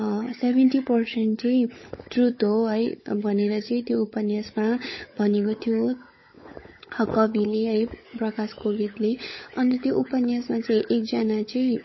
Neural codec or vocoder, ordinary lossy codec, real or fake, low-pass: codec, 16 kHz, 16 kbps, FunCodec, trained on LibriTTS, 50 frames a second; MP3, 24 kbps; fake; 7.2 kHz